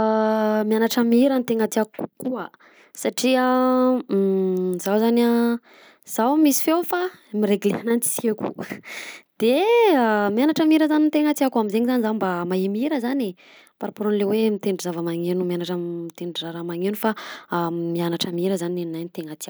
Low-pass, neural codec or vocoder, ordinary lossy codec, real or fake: none; none; none; real